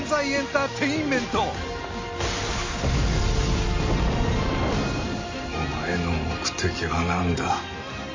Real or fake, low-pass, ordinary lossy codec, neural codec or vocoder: real; 7.2 kHz; MP3, 32 kbps; none